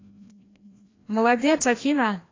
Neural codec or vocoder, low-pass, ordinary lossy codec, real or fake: codec, 16 kHz, 1 kbps, FreqCodec, larger model; 7.2 kHz; AAC, 32 kbps; fake